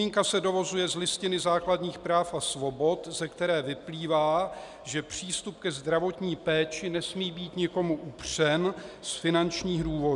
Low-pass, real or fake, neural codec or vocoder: 10.8 kHz; real; none